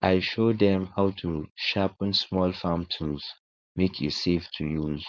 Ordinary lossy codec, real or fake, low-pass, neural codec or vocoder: none; fake; none; codec, 16 kHz, 4.8 kbps, FACodec